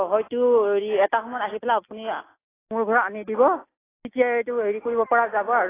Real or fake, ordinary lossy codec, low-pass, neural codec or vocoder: real; AAC, 16 kbps; 3.6 kHz; none